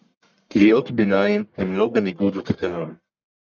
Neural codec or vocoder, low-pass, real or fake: codec, 44.1 kHz, 1.7 kbps, Pupu-Codec; 7.2 kHz; fake